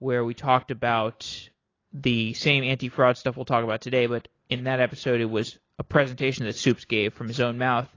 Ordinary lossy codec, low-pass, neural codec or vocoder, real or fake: AAC, 32 kbps; 7.2 kHz; none; real